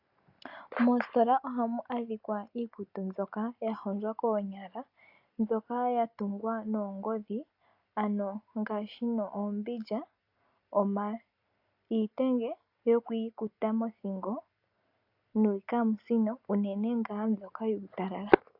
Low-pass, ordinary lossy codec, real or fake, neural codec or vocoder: 5.4 kHz; AAC, 48 kbps; real; none